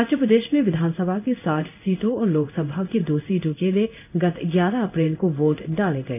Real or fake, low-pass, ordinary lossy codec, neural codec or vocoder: fake; 3.6 kHz; none; codec, 16 kHz in and 24 kHz out, 1 kbps, XY-Tokenizer